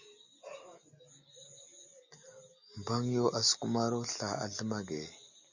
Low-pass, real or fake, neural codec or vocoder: 7.2 kHz; real; none